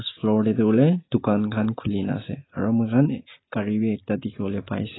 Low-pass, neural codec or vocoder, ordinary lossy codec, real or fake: 7.2 kHz; none; AAC, 16 kbps; real